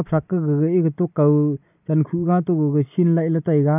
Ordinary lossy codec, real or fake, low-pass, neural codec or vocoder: none; real; 3.6 kHz; none